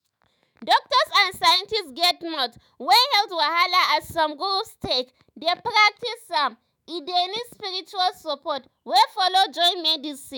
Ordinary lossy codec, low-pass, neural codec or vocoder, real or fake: none; none; autoencoder, 48 kHz, 128 numbers a frame, DAC-VAE, trained on Japanese speech; fake